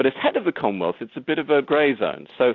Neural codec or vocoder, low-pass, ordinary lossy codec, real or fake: none; 7.2 kHz; AAC, 48 kbps; real